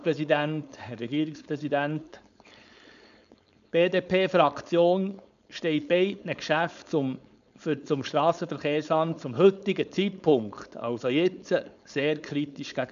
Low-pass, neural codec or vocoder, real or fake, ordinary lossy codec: 7.2 kHz; codec, 16 kHz, 4.8 kbps, FACodec; fake; none